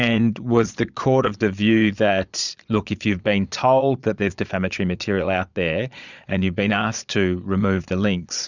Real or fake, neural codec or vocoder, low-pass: fake; vocoder, 22.05 kHz, 80 mel bands, Vocos; 7.2 kHz